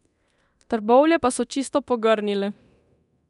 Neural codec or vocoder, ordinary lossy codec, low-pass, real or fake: codec, 24 kHz, 0.9 kbps, DualCodec; none; 10.8 kHz; fake